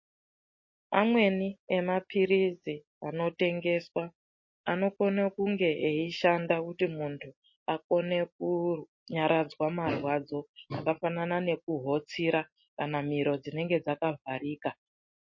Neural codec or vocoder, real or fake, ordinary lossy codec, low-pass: none; real; MP3, 32 kbps; 7.2 kHz